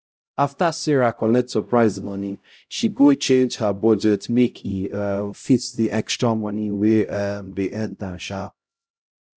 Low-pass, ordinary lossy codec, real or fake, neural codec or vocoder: none; none; fake; codec, 16 kHz, 0.5 kbps, X-Codec, HuBERT features, trained on LibriSpeech